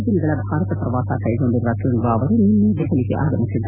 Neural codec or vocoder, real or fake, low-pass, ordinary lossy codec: none; real; 3.6 kHz; none